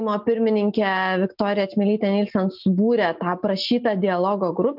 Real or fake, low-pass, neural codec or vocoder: real; 5.4 kHz; none